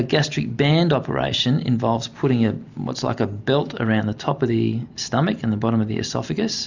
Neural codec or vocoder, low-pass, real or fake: none; 7.2 kHz; real